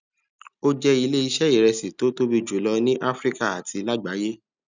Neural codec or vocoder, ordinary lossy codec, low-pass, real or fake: none; none; 7.2 kHz; real